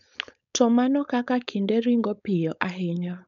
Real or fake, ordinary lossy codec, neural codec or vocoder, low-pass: fake; none; codec, 16 kHz, 4.8 kbps, FACodec; 7.2 kHz